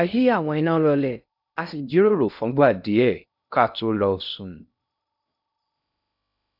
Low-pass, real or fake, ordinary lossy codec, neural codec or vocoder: 5.4 kHz; fake; none; codec, 16 kHz in and 24 kHz out, 0.6 kbps, FocalCodec, streaming, 2048 codes